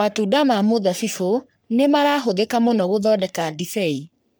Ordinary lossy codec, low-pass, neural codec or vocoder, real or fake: none; none; codec, 44.1 kHz, 3.4 kbps, Pupu-Codec; fake